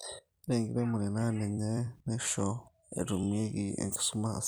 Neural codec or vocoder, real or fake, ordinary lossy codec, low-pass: vocoder, 44.1 kHz, 128 mel bands every 256 samples, BigVGAN v2; fake; none; none